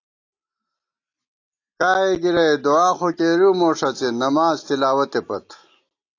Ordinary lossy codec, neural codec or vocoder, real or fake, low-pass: AAC, 48 kbps; none; real; 7.2 kHz